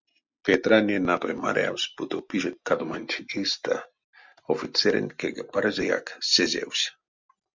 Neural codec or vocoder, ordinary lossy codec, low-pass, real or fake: none; MP3, 64 kbps; 7.2 kHz; real